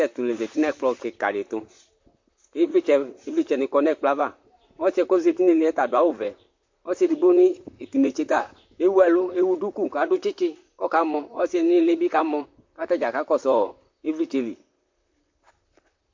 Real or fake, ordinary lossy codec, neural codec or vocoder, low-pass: fake; MP3, 48 kbps; vocoder, 44.1 kHz, 128 mel bands, Pupu-Vocoder; 7.2 kHz